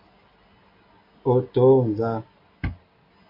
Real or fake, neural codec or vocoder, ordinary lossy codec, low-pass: real; none; MP3, 32 kbps; 5.4 kHz